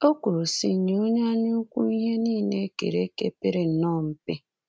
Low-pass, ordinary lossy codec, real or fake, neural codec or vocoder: none; none; real; none